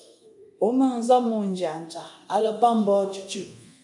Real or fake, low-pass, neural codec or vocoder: fake; 10.8 kHz; codec, 24 kHz, 0.9 kbps, DualCodec